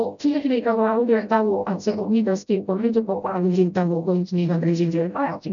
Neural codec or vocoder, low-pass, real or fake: codec, 16 kHz, 0.5 kbps, FreqCodec, smaller model; 7.2 kHz; fake